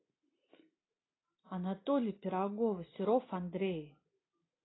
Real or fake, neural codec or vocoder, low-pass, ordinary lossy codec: real; none; 7.2 kHz; AAC, 16 kbps